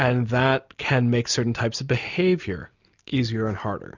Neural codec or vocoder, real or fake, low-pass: none; real; 7.2 kHz